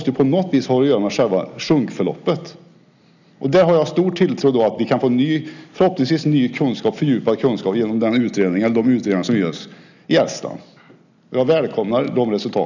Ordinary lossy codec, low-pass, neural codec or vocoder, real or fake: none; 7.2 kHz; none; real